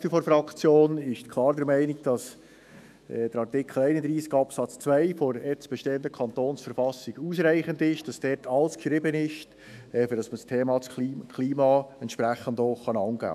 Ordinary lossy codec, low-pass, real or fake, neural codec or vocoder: none; 14.4 kHz; fake; autoencoder, 48 kHz, 128 numbers a frame, DAC-VAE, trained on Japanese speech